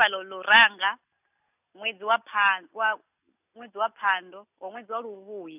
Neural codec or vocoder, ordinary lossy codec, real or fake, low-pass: none; none; real; 3.6 kHz